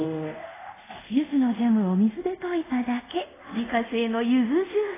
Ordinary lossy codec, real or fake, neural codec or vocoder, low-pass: AAC, 16 kbps; fake; codec, 24 kHz, 0.5 kbps, DualCodec; 3.6 kHz